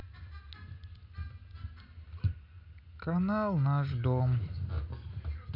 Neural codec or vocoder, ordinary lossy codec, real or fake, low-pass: none; none; real; 5.4 kHz